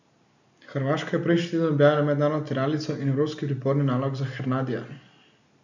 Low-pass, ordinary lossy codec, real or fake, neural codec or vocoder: 7.2 kHz; none; real; none